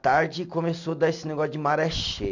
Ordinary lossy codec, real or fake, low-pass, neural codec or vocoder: none; real; 7.2 kHz; none